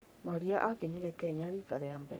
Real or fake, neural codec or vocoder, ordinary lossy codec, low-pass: fake; codec, 44.1 kHz, 3.4 kbps, Pupu-Codec; none; none